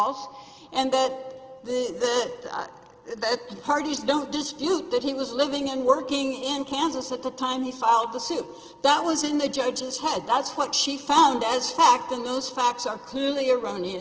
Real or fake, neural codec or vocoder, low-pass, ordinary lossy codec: real; none; 7.2 kHz; Opus, 16 kbps